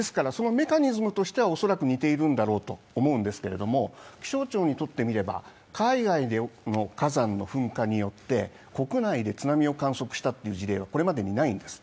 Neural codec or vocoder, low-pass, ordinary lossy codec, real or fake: none; none; none; real